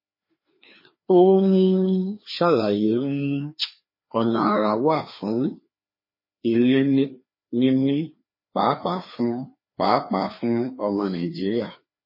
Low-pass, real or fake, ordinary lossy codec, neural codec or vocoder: 5.4 kHz; fake; MP3, 24 kbps; codec, 16 kHz, 2 kbps, FreqCodec, larger model